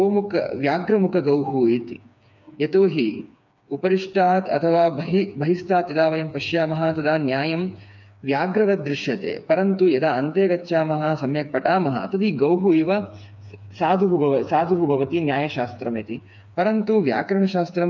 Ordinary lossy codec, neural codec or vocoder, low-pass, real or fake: none; codec, 16 kHz, 4 kbps, FreqCodec, smaller model; 7.2 kHz; fake